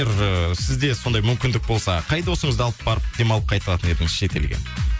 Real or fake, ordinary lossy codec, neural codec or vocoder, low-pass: real; none; none; none